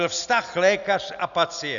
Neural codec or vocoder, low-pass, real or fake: none; 7.2 kHz; real